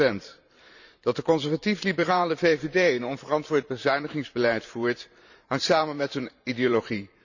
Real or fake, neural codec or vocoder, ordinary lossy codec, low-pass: fake; vocoder, 44.1 kHz, 128 mel bands every 512 samples, BigVGAN v2; none; 7.2 kHz